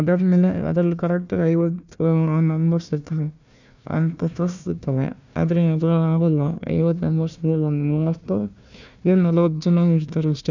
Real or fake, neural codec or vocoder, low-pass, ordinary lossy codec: fake; codec, 16 kHz, 1 kbps, FunCodec, trained on Chinese and English, 50 frames a second; 7.2 kHz; none